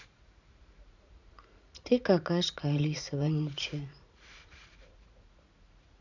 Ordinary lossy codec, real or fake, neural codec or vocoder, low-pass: none; fake; vocoder, 44.1 kHz, 80 mel bands, Vocos; 7.2 kHz